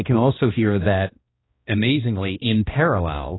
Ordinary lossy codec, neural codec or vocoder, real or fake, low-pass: AAC, 16 kbps; codec, 16 kHz, 1 kbps, X-Codec, HuBERT features, trained on balanced general audio; fake; 7.2 kHz